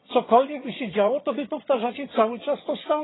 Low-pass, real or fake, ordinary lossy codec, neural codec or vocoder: 7.2 kHz; fake; AAC, 16 kbps; vocoder, 22.05 kHz, 80 mel bands, HiFi-GAN